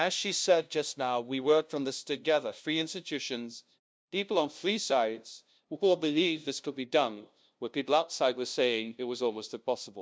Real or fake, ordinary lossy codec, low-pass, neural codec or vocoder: fake; none; none; codec, 16 kHz, 0.5 kbps, FunCodec, trained on LibriTTS, 25 frames a second